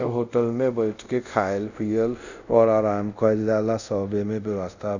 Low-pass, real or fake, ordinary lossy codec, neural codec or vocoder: 7.2 kHz; fake; none; codec, 24 kHz, 0.5 kbps, DualCodec